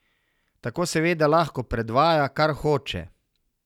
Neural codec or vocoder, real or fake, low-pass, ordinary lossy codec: none; real; 19.8 kHz; none